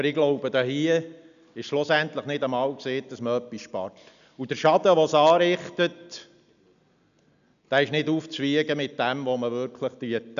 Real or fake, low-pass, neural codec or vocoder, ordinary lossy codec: real; 7.2 kHz; none; none